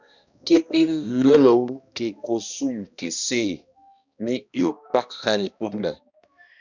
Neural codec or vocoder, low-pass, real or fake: codec, 16 kHz, 1 kbps, X-Codec, HuBERT features, trained on balanced general audio; 7.2 kHz; fake